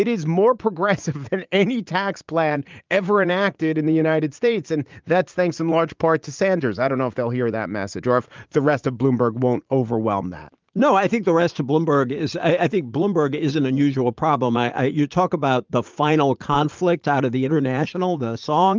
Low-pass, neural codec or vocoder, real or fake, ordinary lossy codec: 7.2 kHz; vocoder, 44.1 kHz, 80 mel bands, Vocos; fake; Opus, 24 kbps